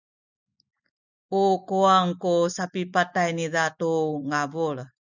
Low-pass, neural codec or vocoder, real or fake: 7.2 kHz; none; real